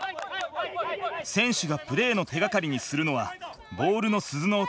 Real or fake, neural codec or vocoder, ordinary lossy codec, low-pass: real; none; none; none